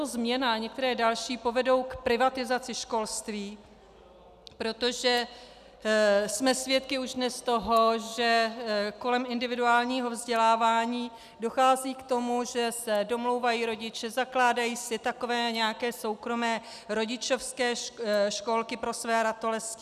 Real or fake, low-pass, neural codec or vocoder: real; 14.4 kHz; none